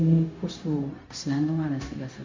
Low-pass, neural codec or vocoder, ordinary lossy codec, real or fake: 7.2 kHz; codec, 16 kHz, 0.4 kbps, LongCat-Audio-Codec; MP3, 64 kbps; fake